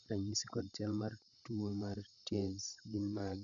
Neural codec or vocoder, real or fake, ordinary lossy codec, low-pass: codec, 16 kHz, 8 kbps, FreqCodec, larger model; fake; none; 7.2 kHz